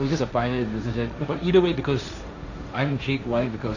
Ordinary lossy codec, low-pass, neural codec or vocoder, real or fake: none; 7.2 kHz; codec, 16 kHz, 1.1 kbps, Voila-Tokenizer; fake